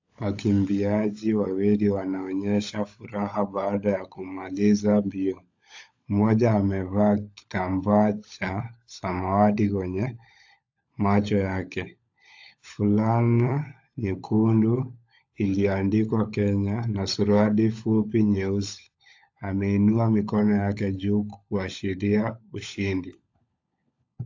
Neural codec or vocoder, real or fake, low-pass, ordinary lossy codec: codec, 16 kHz, 16 kbps, FunCodec, trained on LibriTTS, 50 frames a second; fake; 7.2 kHz; AAC, 48 kbps